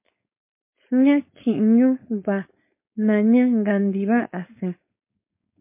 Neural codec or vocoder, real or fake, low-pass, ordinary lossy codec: codec, 16 kHz, 4.8 kbps, FACodec; fake; 3.6 kHz; MP3, 24 kbps